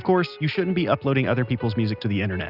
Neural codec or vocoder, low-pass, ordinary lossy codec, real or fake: none; 5.4 kHz; Opus, 64 kbps; real